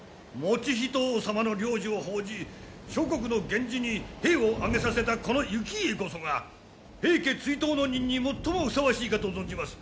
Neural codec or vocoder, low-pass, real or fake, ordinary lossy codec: none; none; real; none